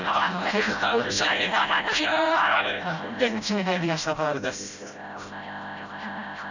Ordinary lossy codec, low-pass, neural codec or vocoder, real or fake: none; 7.2 kHz; codec, 16 kHz, 0.5 kbps, FreqCodec, smaller model; fake